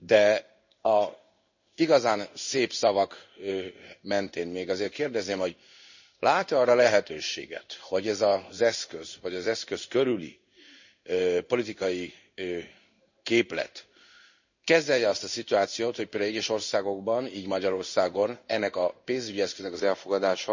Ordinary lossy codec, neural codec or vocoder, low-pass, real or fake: none; codec, 16 kHz in and 24 kHz out, 1 kbps, XY-Tokenizer; 7.2 kHz; fake